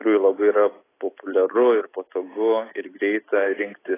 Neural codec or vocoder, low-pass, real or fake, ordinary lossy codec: none; 3.6 kHz; real; AAC, 16 kbps